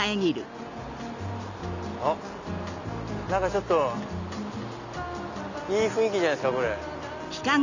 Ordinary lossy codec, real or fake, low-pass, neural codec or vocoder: none; real; 7.2 kHz; none